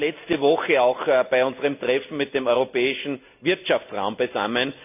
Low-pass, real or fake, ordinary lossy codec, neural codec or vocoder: 3.6 kHz; real; none; none